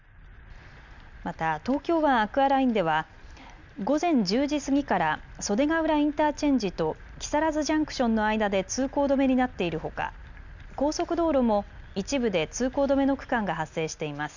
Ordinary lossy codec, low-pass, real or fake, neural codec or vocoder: none; 7.2 kHz; real; none